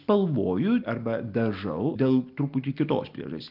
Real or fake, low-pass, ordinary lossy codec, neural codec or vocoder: real; 5.4 kHz; Opus, 32 kbps; none